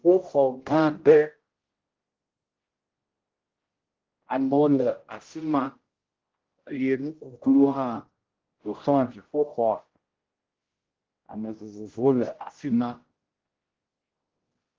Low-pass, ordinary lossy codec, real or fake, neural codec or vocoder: 7.2 kHz; Opus, 16 kbps; fake; codec, 16 kHz, 0.5 kbps, X-Codec, HuBERT features, trained on general audio